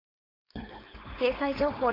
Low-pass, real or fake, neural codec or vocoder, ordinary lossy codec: 5.4 kHz; fake; codec, 16 kHz, 4.8 kbps, FACodec; AAC, 24 kbps